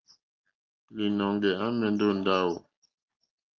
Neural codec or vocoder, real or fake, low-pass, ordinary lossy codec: none; real; 7.2 kHz; Opus, 24 kbps